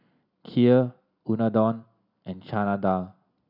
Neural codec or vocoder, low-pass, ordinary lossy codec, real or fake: none; 5.4 kHz; none; real